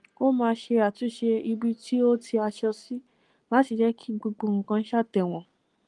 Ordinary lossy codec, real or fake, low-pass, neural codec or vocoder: Opus, 24 kbps; real; 10.8 kHz; none